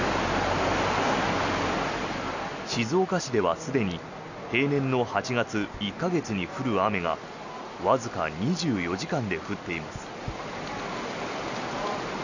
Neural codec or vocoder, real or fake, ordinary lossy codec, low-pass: none; real; none; 7.2 kHz